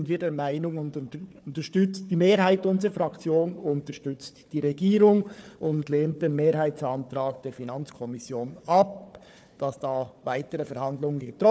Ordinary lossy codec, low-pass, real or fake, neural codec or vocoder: none; none; fake; codec, 16 kHz, 4 kbps, FunCodec, trained on Chinese and English, 50 frames a second